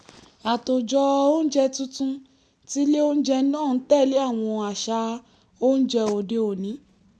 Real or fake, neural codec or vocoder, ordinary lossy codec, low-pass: real; none; none; none